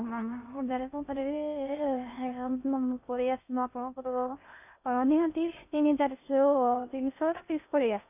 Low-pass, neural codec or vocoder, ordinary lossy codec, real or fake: 3.6 kHz; codec, 16 kHz in and 24 kHz out, 0.6 kbps, FocalCodec, streaming, 2048 codes; none; fake